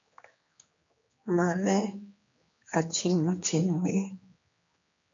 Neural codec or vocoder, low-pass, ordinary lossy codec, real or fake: codec, 16 kHz, 2 kbps, X-Codec, HuBERT features, trained on general audio; 7.2 kHz; MP3, 48 kbps; fake